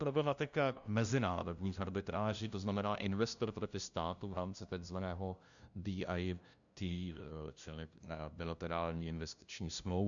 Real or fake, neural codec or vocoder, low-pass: fake; codec, 16 kHz, 0.5 kbps, FunCodec, trained on LibriTTS, 25 frames a second; 7.2 kHz